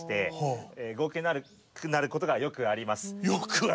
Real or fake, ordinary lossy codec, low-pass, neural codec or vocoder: real; none; none; none